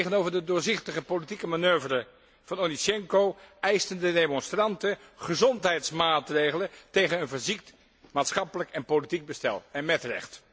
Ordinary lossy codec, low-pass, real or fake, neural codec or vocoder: none; none; real; none